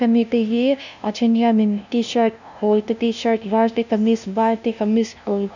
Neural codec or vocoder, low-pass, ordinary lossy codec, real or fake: codec, 16 kHz, 0.5 kbps, FunCodec, trained on LibriTTS, 25 frames a second; 7.2 kHz; none; fake